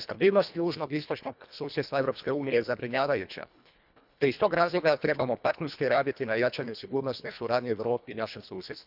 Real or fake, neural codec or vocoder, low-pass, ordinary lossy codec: fake; codec, 24 kHz, 1.5 kbps, HILCodec; 5.4 kHz; none